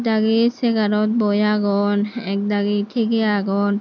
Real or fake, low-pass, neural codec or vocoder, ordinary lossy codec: real; 7.2 kHz; none; none